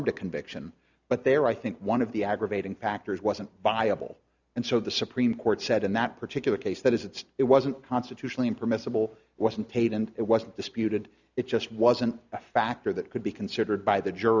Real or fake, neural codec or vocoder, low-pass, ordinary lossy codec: real; none; 7.2 kHz; Opus, 64 kbps